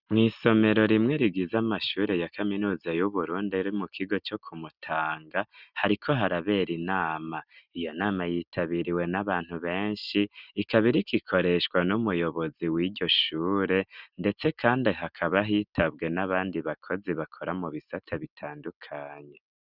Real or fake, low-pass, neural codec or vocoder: real; 5.4 kHz; none